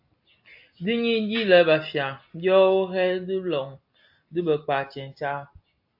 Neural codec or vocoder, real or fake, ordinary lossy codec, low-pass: none; real; AAC, 48 kbps; 5.4 kHz